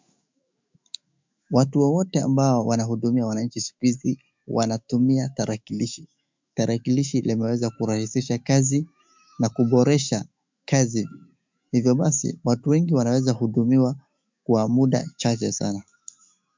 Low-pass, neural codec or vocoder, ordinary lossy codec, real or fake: 7.2 kHz; autoencoder, 48 kHz, 128 numbers a frame, DAC-VAE, trained on Japanese speech; MP3, 64 kbps; fake